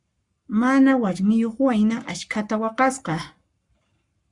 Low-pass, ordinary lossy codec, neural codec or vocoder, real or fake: 10.8 kHz; Opus, 64 kbps; codec, 44.1 kHz, 7.8 kbps, Pupu-Codec; fake